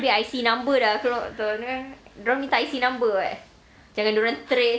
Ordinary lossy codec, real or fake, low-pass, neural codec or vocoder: none; real; none; none